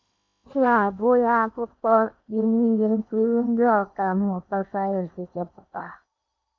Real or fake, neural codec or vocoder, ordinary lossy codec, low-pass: fake; codec, 16 kHz in and 24 kHz out, 0.8 kbps, FocalCodec, streaming, 65536 codes; MP3, 48 kbps; 7.2 kHz